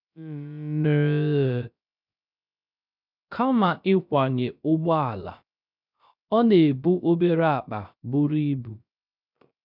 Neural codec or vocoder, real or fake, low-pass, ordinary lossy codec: codec, 16 kHz, 0.3 kbps, FocalCodec; fake; 5.4 kHz; none